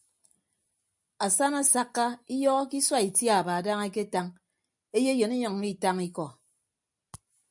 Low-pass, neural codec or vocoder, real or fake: 10.8 kHz; none; real